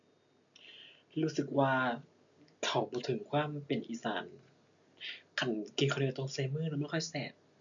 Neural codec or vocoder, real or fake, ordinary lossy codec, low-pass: none; real; MP3, 96 kbps; 7.2 kHz